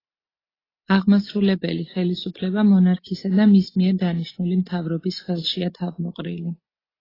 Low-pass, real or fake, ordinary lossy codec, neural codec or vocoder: 5.4 kHz; real; AAC, 24 kbps; none